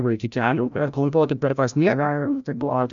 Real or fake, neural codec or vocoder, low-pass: fake; codec, 16 kHz, 0.5 kbps, FreqCodec, larger model; 7.2 kHz